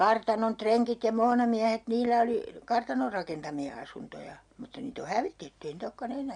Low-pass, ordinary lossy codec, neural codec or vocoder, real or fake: 9.9 kHz; MP3, 64 kbps; none; real